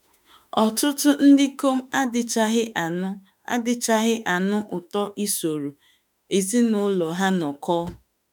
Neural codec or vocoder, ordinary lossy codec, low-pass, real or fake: autoencoder, 48 kHz, 32 numbers a frame, DAC-VAE, trained on Japanese speech; none; none; fake